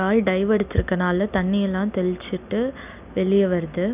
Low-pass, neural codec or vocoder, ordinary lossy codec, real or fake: 3.6 kHz; none; none; real